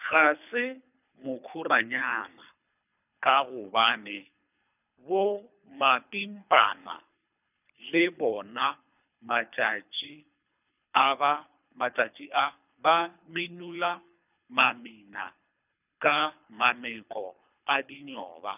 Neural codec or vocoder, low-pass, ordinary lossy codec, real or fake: codec, 24 kHz, 3 kbps, HILCodec; 3.6 kHz; none; fake